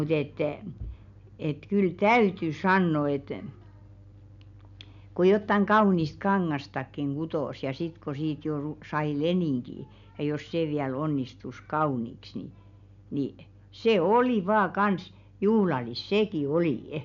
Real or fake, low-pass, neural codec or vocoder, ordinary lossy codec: real; 7.2 kHz; none; MP3, 96 kbps